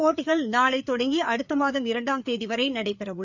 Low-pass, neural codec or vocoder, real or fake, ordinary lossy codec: 7.2 kHz; codec, 16 kHz, 4 kbps, FreqCodec, larger model; fake; none